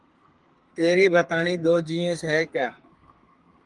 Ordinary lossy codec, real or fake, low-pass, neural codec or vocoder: Opus, 32 kbps; fake; 10.8 kHz; codec, 32 kHz, 1.9 kbps, SNAC